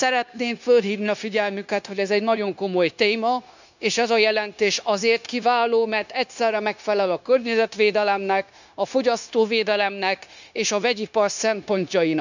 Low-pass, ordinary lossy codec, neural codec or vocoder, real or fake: 7.2 kHz; none; codec, 16 kHz, 0.9 kbps, LongCat-Audio-Codec; fake